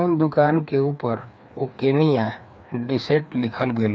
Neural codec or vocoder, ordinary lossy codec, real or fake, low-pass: codec, 16 kHz, 2 kbps, FreqCodec, larger model; none; fake; none